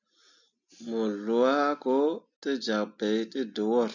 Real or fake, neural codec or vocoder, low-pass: real; none; 7.2 kHz